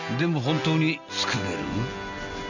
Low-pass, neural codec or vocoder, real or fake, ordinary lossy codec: 7.2 kHz; none; real; none